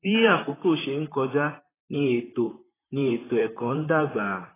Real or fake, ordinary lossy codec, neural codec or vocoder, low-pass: fake; AAC, 16 kbps; vocoder, 44.1 kHz, 128 mel bands, Pupu-Vocoder; 3.6 kHz